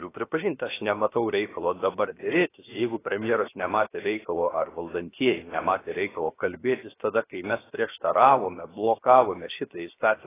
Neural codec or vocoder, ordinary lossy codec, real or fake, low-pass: codec, 16 kHz, 0.7 kbps, FocalCodec; AAC, 16 kbps; fake; 3.6 kHz